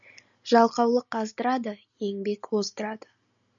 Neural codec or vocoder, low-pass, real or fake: none; 7.2 kHz; real